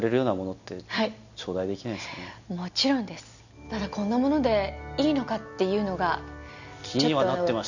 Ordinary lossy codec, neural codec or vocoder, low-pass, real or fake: none; none; 7.2 kHz; real